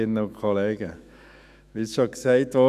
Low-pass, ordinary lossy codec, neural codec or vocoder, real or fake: 14.4 kHz; none; autoencoder, 48 kHz, 128 numbers a frame, DAC-VAE, trained on Japanese speech; fake